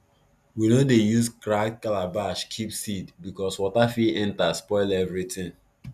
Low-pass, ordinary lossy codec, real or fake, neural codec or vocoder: 14.4 kHz; none; real; none